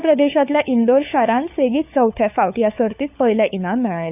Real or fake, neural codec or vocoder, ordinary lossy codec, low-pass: fake; codec, 16 kHz, 4 kbps, FunCodec, trained on LibriTTS, 50 frames a second; none; 3.6 kHz